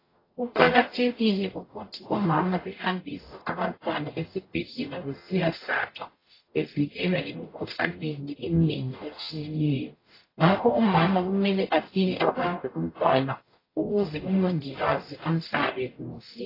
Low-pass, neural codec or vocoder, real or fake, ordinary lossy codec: 5.4 kHz; codec, 44.1 kHz, 0.9 kbps, DAC; fake; AAC, 24 kbps